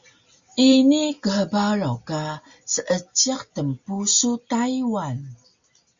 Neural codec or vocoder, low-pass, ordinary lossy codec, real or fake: none; 7.2 kHz; Opus, 64 kbps; real